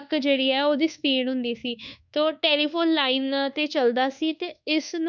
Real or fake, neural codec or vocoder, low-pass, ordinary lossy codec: fake; codec, 24 kHz, 1.2 kbps, DualCodec; 7.2 kHz; none